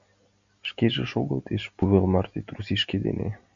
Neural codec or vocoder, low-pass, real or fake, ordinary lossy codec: none; 7.2 kHz; real; MP3, 96 kbps